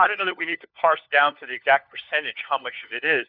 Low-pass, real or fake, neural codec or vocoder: 5.4 kHz; fake; codec, 16 kHz, 4 kbps, FunCodec, trained on LibriTTS, 50 frames a second